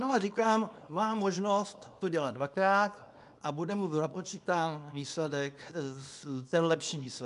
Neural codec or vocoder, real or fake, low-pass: codec, 24 kHz, 0.9 kbps, WavTokenizer, small release; fake; 10.8 kHz